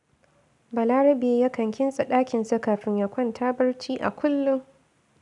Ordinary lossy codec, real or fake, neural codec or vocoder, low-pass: none; real; none; 10.8 kHz